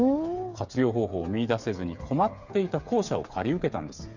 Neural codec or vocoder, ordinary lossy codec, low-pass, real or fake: codec, 16 kHz, 8 kbps, FreqCodec, smaller model; none; 7.2 kHz; fake